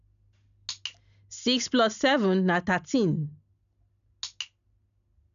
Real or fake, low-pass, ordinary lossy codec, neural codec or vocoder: real; 7.2 kHz; none; none